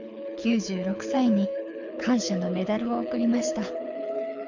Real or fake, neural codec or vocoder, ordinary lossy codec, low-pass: fake; codec, 24 kHz, 6 kbps, HILCodec; none; 7.2 kHz